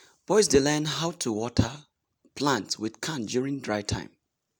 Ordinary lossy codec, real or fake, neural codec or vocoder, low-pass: none; real; none; none